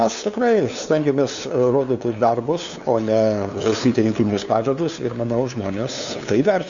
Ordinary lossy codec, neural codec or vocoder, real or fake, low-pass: Opus, 64 kbps; codec, 16 kHz, 2 kbps, FunCodec, trained on LibriTTS, 25 frames a second; fake; 7.2 kHz